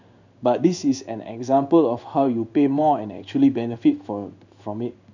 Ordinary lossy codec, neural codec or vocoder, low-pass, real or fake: none; codec, 16 kHz in and 24 kHz out, 1 kbps, XY-Tokenizer; 7.2 kHz; fake